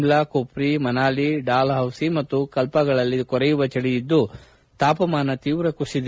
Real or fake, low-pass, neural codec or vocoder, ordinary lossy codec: real; none; none; none